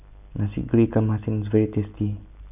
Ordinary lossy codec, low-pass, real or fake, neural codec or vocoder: none; 3.6 kHz; real; none